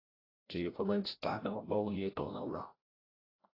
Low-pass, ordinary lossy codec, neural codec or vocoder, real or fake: 5.4 kHz; AAC, 24 kbps; codec, 16 kHz, 0.5 kbps, FreqCodec, larger model; fake